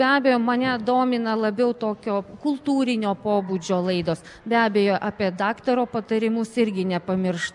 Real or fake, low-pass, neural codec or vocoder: real; 10.8 kHz; none